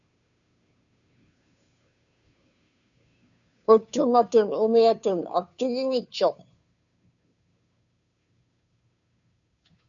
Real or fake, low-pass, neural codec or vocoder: fake; 7.2 kHz; codec, 16 kHz, 2 kbps, FunCodec, trained on Chinese and English, 25 frames a second